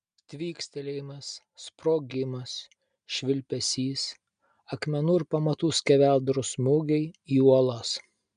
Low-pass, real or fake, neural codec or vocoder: 9.9 kHz; real; none